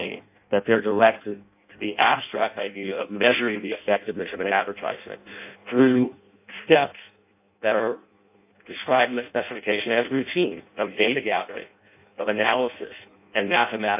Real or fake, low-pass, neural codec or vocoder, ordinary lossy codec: fake; 3.6 kHz; codec, 16 kHz in and 24 kHz out, 0.6 kbps, FireRedTTS-2 codec; AAC, 32 kbps